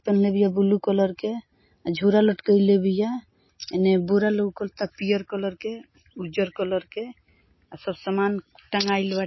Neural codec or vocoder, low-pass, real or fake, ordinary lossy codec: none; 7.2 kHz; real; MP3, 24 kbps